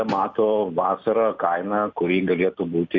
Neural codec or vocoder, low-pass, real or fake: none; 7.2 kHz; real